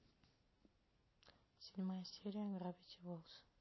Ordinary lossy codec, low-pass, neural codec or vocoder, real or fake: MP3, 24 kbps; 7.2 kHz; none; real